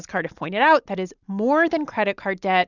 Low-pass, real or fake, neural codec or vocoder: 7.2 kHz; real; none